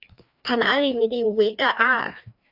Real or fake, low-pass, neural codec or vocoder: fake; 5.4 kHz; codec, 16 kHz, 2 kbps, FreqCodec, larger model